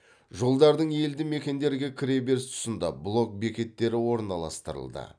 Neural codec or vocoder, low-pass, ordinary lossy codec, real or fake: none; 9.9 kHz; none; real